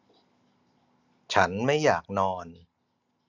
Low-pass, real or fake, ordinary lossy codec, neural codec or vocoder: 7.2 kHz; real; none; none